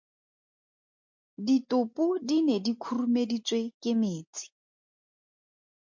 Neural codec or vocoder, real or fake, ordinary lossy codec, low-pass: none; real; MP3, 48 kbps; 7.2 kHz